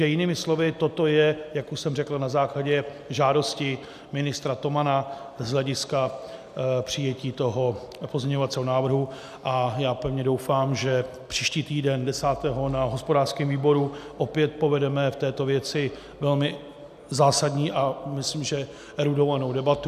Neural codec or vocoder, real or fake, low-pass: none; real; 14.4 kHz